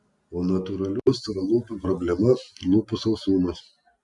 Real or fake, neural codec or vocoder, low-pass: real; none; 10.8 kHz